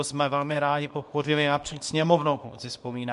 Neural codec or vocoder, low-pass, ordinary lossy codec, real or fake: codec, 24 kHz, 0.9 kbps, WavTokenizer, small release; 10.8 kHz; MP3, 64 kbps; fake